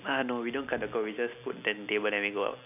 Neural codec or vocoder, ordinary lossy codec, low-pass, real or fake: none; none; 3.6 kHz; real